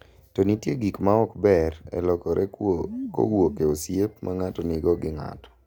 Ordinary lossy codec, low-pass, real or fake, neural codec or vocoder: none; 19.8 kHz; real; none